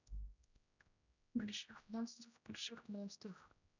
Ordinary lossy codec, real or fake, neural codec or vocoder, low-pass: none; fake; codec, 16 kHz, 0.5 kbps, X-Codec, HuBERT features, trained on general audio; 7.2 kHz